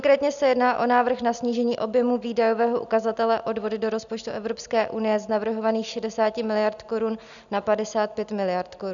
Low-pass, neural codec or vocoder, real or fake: 7.2 kHz; none; real